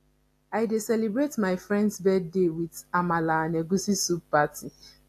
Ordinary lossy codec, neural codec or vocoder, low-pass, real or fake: AAC, 64 kbps; none; 14.4 kHz; real